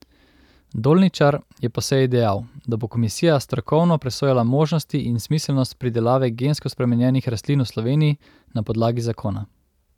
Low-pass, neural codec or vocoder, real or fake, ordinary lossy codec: 19.8 kHz; none; real; none